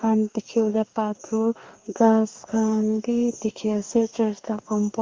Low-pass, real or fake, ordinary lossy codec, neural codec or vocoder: 7.2 kHz; fake; Opus, 32 kbps; codec, 44.1 kHz, 2.6 kbps, DAC